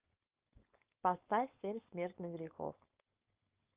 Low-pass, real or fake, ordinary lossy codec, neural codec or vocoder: 3.6 kHz; fake; Opus, 24 kbps; codec, 16 kHz, 4.8 kbps, FACodec